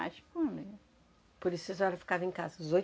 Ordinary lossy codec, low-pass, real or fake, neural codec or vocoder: none; none; real; none